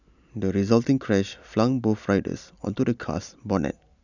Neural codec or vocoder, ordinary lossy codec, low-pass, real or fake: none; none; 7.2 kHz; real